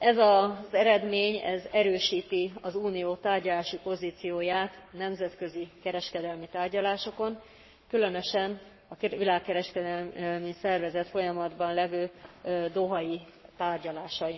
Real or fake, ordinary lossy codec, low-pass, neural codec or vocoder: fake; MP3, 24 kbps; 7.2 kHz; codec, 44.1 kHz, 7.8 kbps, Pupu-Codec